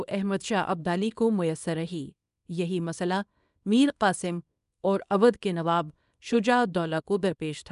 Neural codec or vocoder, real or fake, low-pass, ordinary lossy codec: codec, 24 kHz, 0.9 kbps, WavTokenizer, small release; fake; 10.8 kHz; none